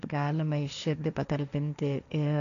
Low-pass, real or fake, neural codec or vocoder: 7.2 kHz; fake; codec, 16 kHz, 1.1 kbps, Voila-Tokenizer